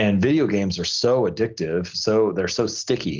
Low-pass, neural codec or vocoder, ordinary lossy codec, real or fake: 7.2 kHz; none; Opus, 16 kbps; real